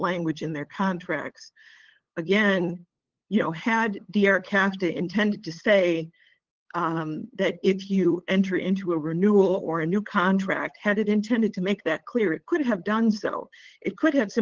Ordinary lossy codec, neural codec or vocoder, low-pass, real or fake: Opus, 16 kbps; codec, 16 kHz, 8 kbps, FunCodec, trained on LibriTTS, 25 frames a second; 7.2 kHz; fake